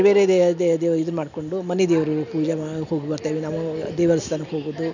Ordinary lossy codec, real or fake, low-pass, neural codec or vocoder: none; real; 7.2 kHz; none